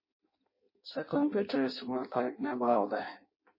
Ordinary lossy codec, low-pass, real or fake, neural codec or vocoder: MP3, 24 kbps; 5.4 kHz; fake; codec, 16 kHz in and 24 kHz out, 0.6 kbps, FireRedTTS-2 codec